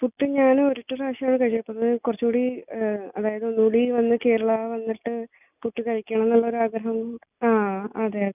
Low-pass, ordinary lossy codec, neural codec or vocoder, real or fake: 3.6 kHz; none; none; real